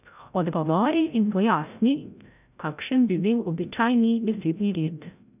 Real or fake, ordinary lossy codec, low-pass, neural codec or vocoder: fake; none; 3.6 kHz; codec, 16 kHz, 0.5 kbps, FreqCodec, larger model